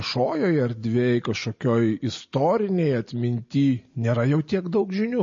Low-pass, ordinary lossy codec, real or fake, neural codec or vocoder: 7.2 kHz; MP3, 32 kbps; real; none